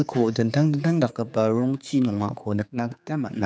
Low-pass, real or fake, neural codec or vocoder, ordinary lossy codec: none; fake; codec, 16 kHz, 4 kbps, X-Codec, HuBERT features, trained on general audio; none